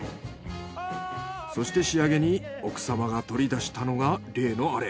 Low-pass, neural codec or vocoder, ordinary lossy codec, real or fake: none; none; none; real